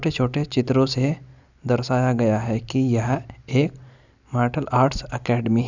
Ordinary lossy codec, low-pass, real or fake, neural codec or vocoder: none; 7.2 kHz; real; none